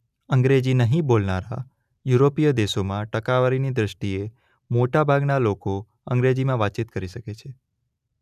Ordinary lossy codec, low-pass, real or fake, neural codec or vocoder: none; 14.4 kHz; real; none